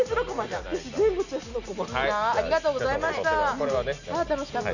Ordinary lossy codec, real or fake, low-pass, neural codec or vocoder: none; real; 7.2 kHz; none